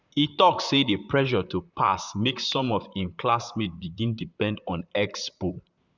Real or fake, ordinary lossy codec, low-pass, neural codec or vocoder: fake; Opus, 64 kbps; 7.2 kHz; vocoder, 44.1 kHz, 128 mel bands, Pupu-Vocoder